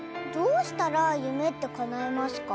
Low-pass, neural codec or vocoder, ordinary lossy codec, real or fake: none; none; none; real